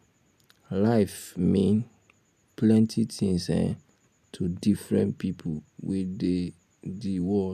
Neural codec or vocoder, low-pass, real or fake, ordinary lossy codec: none; 14.4 kHz; real; none